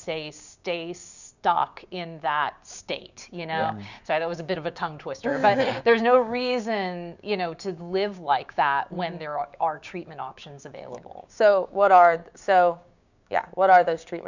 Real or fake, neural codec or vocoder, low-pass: fake; codec, 16 kHz, 6 kbps, DAC; 7.2 kHz